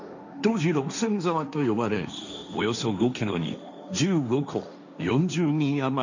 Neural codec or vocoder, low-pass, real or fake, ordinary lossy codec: codec, 16 kHz, 1.1 kbps, Voila-Tokenizer; 7.2 kHz; fake; none